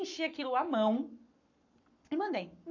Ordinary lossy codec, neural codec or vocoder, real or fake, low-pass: none; codec, 44.1 kHz, 7.8 kbps, Pupu-Codec; fake; 7.2 kHz